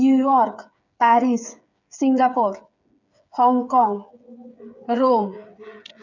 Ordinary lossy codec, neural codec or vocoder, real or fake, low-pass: none; codec, 16 kHz, 8 kbps, FreqCodec, smaller model; fake; 7.2 kHz